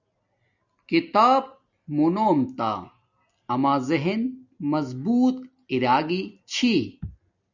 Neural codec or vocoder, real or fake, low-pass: none; real; 7.2 kHz